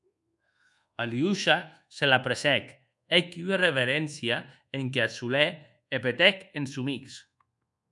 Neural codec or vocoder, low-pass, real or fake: codec, 24 kHz, 1.2 kbps, DualCodec; 10.8 kHz; fake